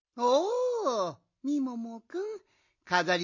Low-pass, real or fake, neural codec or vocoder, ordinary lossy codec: 7.2 kHz; real; none; MP3, 32 kbps